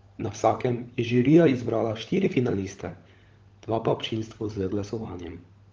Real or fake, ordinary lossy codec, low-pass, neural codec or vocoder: fake; Opus, 24 kbps; 7.2 kHz; codec, 16 kHz, 16 kbps, FunCodec, trained on LibriTTS, 50 frames a second